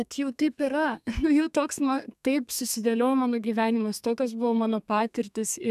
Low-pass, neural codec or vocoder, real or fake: 14.4 kHz; codec, 32 kHz, 1.9 kbps, SNAC; fake